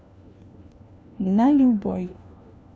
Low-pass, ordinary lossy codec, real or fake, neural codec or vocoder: none; none; fake; codec, 16 kHz, 1 kbps, FunCodec, trained on LibriTTS, 50 frames a second